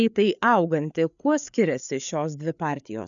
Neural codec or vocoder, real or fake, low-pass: codec, 16 kHz, 4 kbps, FreqCodec, larger model; fake; 7.2 kHz